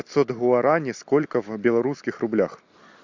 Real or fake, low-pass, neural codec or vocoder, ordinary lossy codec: real; 7.2 kHz; none; MP3, 64 kbps